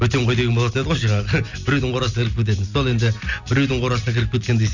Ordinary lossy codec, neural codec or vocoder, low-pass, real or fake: none; none; 7.2 kHz; real